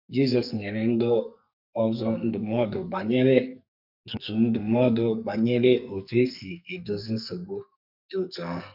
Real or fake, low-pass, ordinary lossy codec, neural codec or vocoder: fake; 5.4 kHz; none; codec, 44.1 kHz, 2.6 kbps, SNAC